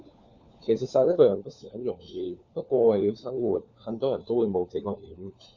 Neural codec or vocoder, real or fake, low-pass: codec, 16 kHz, 4 kbps, FunCodec, trained on LibriTTS, 50 frames a second; fake; 7.2 kHz